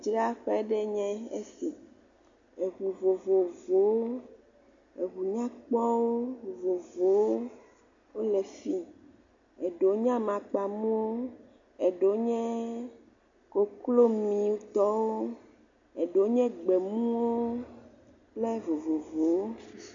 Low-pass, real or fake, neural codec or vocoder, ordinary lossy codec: 7.2 kHz; real; none; AAC, 64 kbps